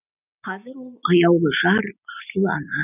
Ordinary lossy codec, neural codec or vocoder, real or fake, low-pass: none; none; real; 3.6 kHz